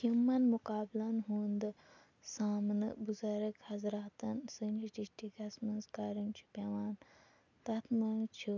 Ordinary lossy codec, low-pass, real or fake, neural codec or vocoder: none; 7.2 kHz; real; none